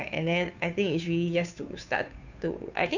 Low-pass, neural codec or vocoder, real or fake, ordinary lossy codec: 7.2 kHz; codec, 16 kHz, 2 kbps, FunCodec, trained on Chinese and English, 25 frames a second; fake; none